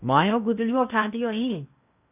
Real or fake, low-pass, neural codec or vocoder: fake; 3.6 kHz; codec, 16 kHz in and 24 kHz out, 0.8 kbps, FocalCodec, streaming, 65536 codes